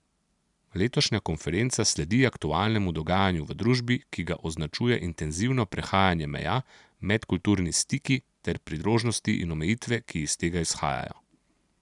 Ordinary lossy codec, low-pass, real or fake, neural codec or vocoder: none; 10.8 kHz; real; none